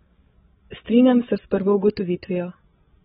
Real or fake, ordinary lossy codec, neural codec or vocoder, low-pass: fake; AAC, 16 kbps; codec, 16 kHz, 16 kbps, FreqCodec, larger model; 7.2 kHz